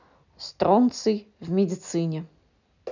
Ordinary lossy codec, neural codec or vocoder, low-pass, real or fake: none; none; 7.2 kHz; real